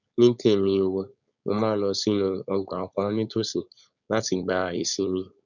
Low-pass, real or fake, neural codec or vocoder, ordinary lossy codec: 7.2 kHz; fake; codec, 16 kHz, 4.8 kbps, FACodec; none